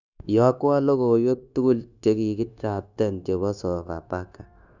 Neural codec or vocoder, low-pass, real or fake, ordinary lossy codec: codec, 16 kHz, 0.9 kbps, LongCat-Audio-Codec; 7.2 kHz; fake; none